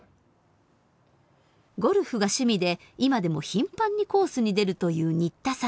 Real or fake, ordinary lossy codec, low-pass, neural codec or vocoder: real; none; none; none